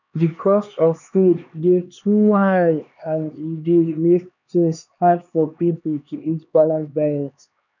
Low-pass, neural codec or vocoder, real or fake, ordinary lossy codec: 7.2 kHz; codec, 16 kHz, 2 kbps, X-Codec, HuBERT features, trained on LibriSpeech; fake; none